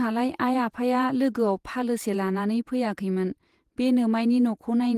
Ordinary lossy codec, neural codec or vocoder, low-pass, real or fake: Opus, 24 kbps; vocoder, 48 kHz, 128 mel bands, Vocos; 14.4 kHz; fake